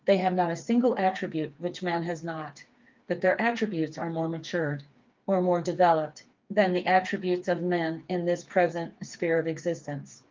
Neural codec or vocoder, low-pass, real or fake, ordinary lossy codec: codec, 16 kHz, 4 kbps, FreqCodec, smaller model; 7.2 kHz; fake; Opus, 32 kbps